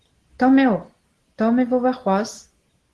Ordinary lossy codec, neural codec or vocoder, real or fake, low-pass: Opus, 16 kbps; none; real; 10.8 kHz